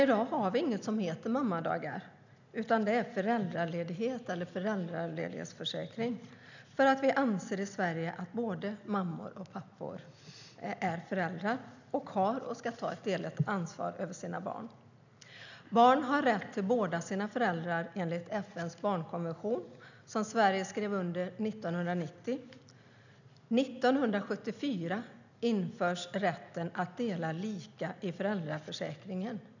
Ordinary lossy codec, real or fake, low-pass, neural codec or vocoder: none; real; 7.2 kHz; none